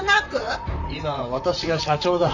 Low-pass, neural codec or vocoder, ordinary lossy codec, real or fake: 7.2 kHz; vocoder, 44.1 kHz, 128 mel bands, Pupu-Vocoder; none; fake